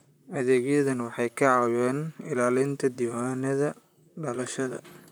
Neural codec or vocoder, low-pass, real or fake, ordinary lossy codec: vocoder, 44.1 kHz, 128 mel bands, Pupu-Vocoder; none; fake; none